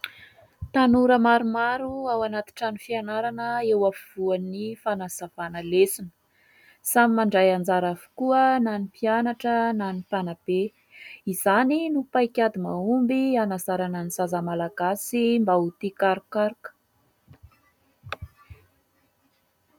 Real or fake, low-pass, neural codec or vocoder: real; 19.8 kHz; none